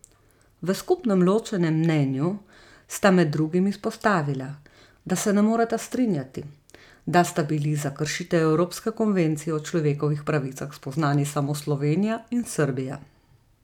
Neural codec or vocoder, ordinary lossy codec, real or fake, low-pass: none; none; real; 19.8 kHz